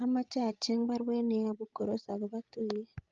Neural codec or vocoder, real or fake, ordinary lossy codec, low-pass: codec, 16 kHz, 16 kbps, FreqCodec, larger model; fake; Opus, 24 kbps; 7.2 kHz